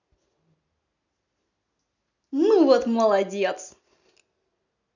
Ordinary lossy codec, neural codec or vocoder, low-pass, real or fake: none; none; 7.2 kHz; real